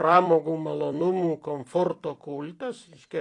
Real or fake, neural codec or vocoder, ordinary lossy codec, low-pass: fake; vocoder, 44.1 kHz, 128 mel bands every 256 samples, BigVGAN v2; AAC, 48 kbps; 10.8 kHz